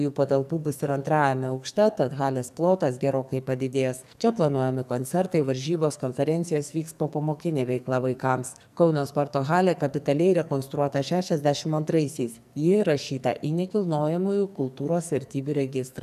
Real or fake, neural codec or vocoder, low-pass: fake; codec, 44.1 kHz, 2.6 kbps, SNAC; 14.4 kHz